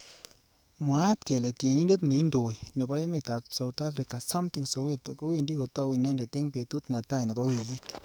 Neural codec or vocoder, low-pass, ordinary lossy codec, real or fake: codec, 44.1 kHz, 2.6 kbps, SNAC; none; none; fake